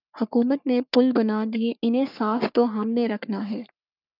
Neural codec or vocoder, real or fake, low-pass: codec, 44.1 kHz, 3.4 kbps, Pupu-Codec; fake; 5.4 kHz